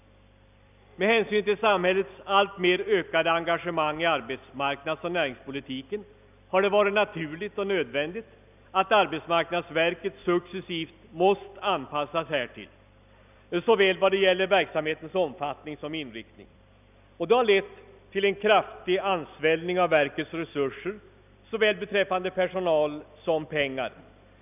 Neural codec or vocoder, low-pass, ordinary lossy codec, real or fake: none; 3.6 kHz; none; real